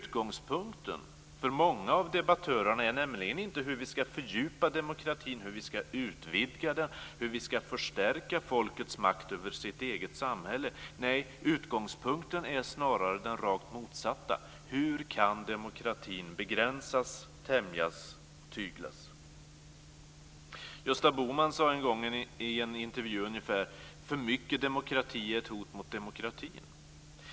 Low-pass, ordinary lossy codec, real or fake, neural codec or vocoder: none; none; real; none